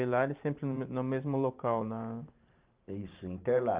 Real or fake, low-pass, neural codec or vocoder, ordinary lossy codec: fake; 3.6 kHz; vocoder, 44.1 kHz, 128 mel bands every 512 samples, BigVGAN v2; Opus, 24 kbps